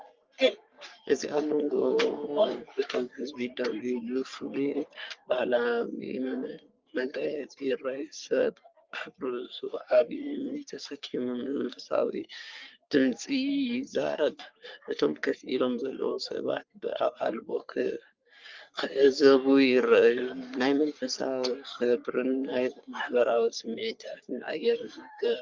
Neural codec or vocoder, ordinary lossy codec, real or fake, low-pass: codec, 44.1 kHz, 3.4 kbps, Pupu-Codec; Opus, 24 kbps; fake; 7.2 kHz